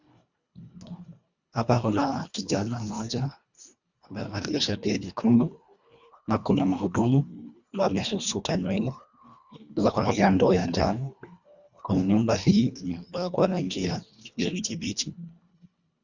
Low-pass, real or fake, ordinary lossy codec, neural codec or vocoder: 7.2 kHz; fake; Opus, 64 kbps; codec, 24 kHz, 1.5 kbps, HILCodec